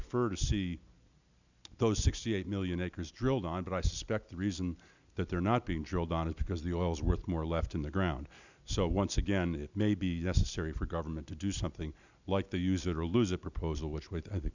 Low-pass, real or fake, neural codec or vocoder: 7.2 kHz; real; none